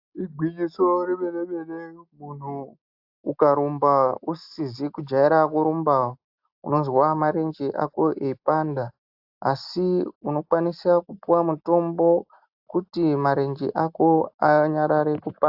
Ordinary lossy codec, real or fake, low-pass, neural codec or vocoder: AAC, 48 kbps; real; 5.4 kHz; none